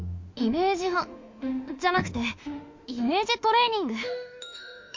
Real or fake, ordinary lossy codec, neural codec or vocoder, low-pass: fake; MP3, 48 kbps; autoencoder, 48 kHz, 32 numbers a frame, DAC-VAE, trained on Japanese speech; 7.2 kHz